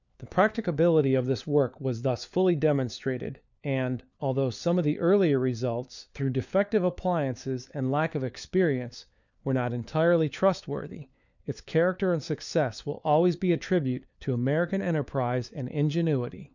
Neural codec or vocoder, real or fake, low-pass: codec, 16 kHz, 4 kbps, FunCodec, trained on LibriTTS, 50 frames a second; fake; 7.2 kHz